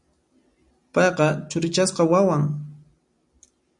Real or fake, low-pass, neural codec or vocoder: real; 10.8 kHz; none